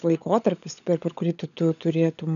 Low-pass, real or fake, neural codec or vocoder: 7.2 kHz; fake; codec, 16 kHz, 16 kbps, FunCodec, trained on LibriTTS, 50 frames a second